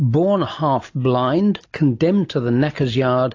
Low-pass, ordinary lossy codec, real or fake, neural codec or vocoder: 7.2 kHz; AAC, 48 kbps; real; none